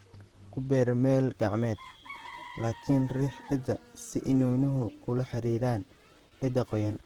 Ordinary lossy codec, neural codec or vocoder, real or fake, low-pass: Opus, 16 kbps; vocoder, 44.1 kHz, 128 mel bands, Pupu-Vocoder; fake; 19.8 kHz